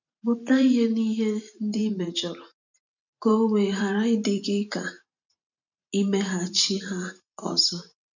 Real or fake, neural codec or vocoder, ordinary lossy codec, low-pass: fake; vocoder, 44.1 kHz, 128 mel bands every 512 samples, BigVGAN v2; none; 7.2 kHz